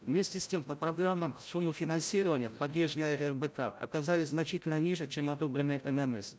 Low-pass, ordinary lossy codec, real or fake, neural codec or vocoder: none; none; fake; codec, 16 kHz, 0.5 kbps, FreqCodec, larger model